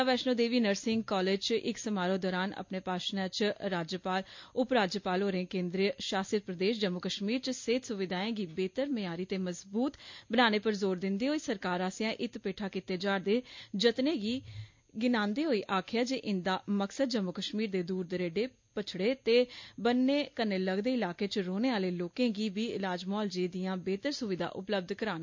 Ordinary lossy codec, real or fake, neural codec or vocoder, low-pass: MP3, 48 kbps; real; none; 7.2 kHz